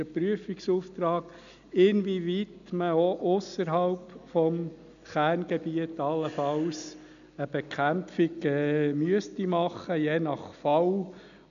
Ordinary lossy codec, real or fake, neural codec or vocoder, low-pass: none; real; none; 7.2 kHz